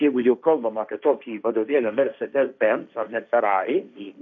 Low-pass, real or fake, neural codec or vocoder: 7.2 kHz; fake; codec, 16 kHz, 1.1 kbps, Voila-Tokenizer